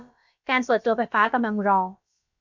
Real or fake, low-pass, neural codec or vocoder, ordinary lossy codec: fake; 7.2 kHz; codec, 16 kHz, about 1 kbps, DyCAST, with the encoder's durations; MP3, 64 kbps